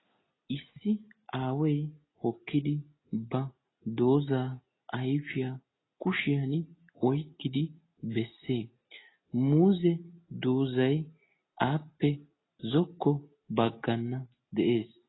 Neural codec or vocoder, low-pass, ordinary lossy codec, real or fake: none; 7.2 kHz; AAC, 16 kbps; real